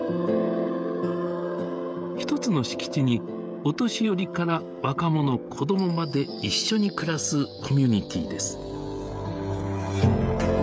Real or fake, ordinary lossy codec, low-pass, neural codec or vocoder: fake; none; none; codec, 16 kHz, 16 kbps, FreqCodec, smaller model